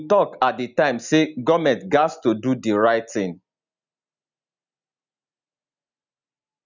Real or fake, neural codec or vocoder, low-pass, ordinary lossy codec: real; none; 7.2 kHz; none